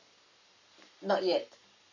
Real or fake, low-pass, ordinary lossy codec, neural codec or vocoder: fake; 7.2 kHz; none; codec, 44.1 kHz, 7.8 kbps, Pupu-Codec